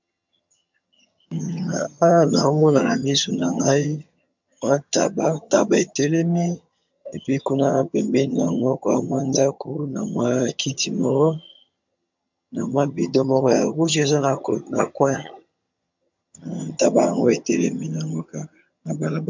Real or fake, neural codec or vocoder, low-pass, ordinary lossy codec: fake; vocoder, 22.05 kHz, 80 mel bands, HiFi-GAN; 7.2 kHz; MP3, 64 kbps